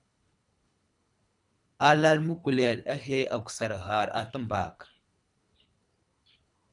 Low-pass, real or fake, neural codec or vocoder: 10.8 kHz; fake; codec, 24 kHz, 3 kbps, HILCodec